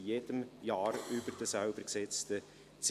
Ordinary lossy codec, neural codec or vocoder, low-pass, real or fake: AAC, 96 kbps; none; 14.4 kHz; real